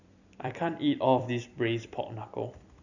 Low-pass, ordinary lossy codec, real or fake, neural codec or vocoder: 7.2 kHz; none; fake; vocoder, 44.1 kHz, 128 mel bands every 256 samples, BigVGAN v2